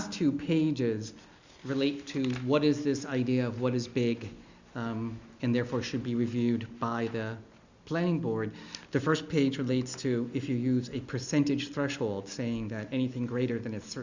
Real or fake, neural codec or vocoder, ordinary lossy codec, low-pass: real; none; Opus, 64 kbps; 7.2 kHz